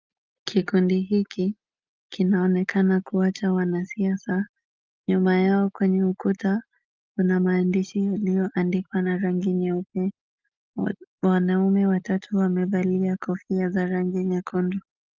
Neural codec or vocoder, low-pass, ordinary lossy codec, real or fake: none; 7.2 kHz; Opus, 24 kbps; real